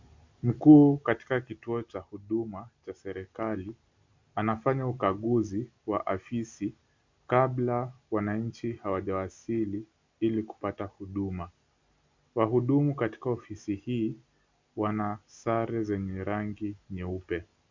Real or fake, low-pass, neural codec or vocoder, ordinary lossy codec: real; 7.2 kHz; none; MP3, 64 kbps